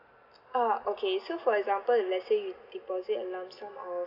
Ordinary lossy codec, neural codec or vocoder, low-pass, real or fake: none; none; 5.4 kHz; real